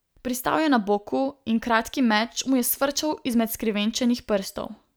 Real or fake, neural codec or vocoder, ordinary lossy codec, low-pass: real; none; none; none